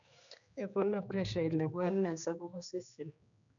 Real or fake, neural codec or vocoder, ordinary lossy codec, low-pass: fake; codec, 16 kHz, 2 kbps, X-Codec, HuBERT features, trained on general audio; none; 7.2 kHz